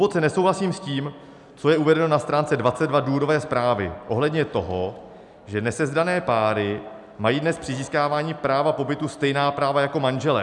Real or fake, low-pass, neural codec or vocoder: real; 10.8 kHz; none